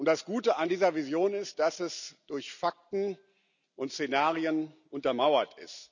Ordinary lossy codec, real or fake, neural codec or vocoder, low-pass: none; real; none; 7.2 kHz